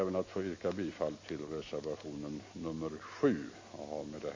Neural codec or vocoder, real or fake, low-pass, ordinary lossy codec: none; real; 7.2 kHz; MP3, 32 kbps